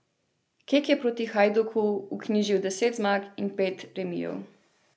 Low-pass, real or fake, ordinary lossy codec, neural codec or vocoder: none; real; none; none